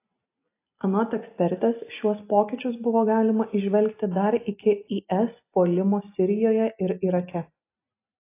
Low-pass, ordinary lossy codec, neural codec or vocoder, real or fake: 3.6 kHz; AAC, 24 kbps; none; real